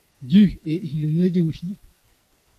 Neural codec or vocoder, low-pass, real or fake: codec, 44.1 kHz, 2.6 kbps, SNAC; 14.4 kHz; fake